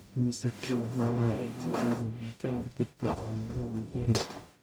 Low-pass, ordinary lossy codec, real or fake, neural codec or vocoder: none; none; fake; codec, 44.1 kHz, 0.9 kbps, DAC